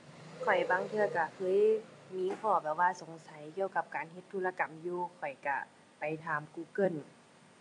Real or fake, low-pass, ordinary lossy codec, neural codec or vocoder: fake; 10.8 kHz; AAC, 48 kbps; vocoder, 44.1 kHz, 128 mel bands every 256 samples, BigVGAN v2